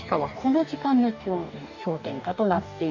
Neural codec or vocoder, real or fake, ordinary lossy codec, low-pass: codec, 44.1 kHz, 2.6 kbps, DAC; fake; none; 7.2 kHz